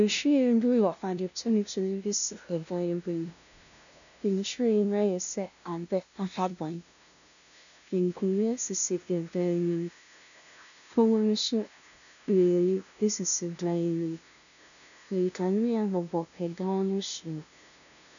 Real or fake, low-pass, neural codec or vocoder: fake; 7.2 kHz; codec, 16 kHz, 0.5 kbps, FunCodec, trained on LibriTTS, 25 frames a second